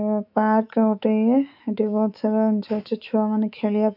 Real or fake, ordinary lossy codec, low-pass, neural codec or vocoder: fake; none; 5.4 kHz; autoencoder, 48 kHz, 128 numbers a frame, DAC-VAE, trained on Japanese speech